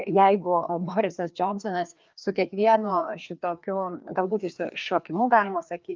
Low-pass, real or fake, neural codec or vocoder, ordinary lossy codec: 7.2 kHz; fake; codec, 16 kHz, 2 kbps, FreqCodec, larger model; Opus, 24 kbps